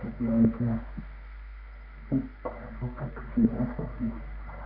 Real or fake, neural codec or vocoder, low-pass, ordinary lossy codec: fake; codec, 24 kHz, 0.9 kbps, WavTokenizer, medium music audio release; 5.4 kHz; none